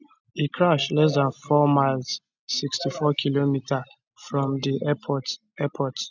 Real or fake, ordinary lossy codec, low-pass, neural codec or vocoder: real; none; 7.2 kHz; none